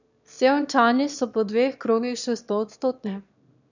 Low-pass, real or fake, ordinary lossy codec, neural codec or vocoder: 7.2 kHz; fake; none; autoencoder, 22.05 kHz, a latent of 192 numbers a frame, VITS, trained on one speaker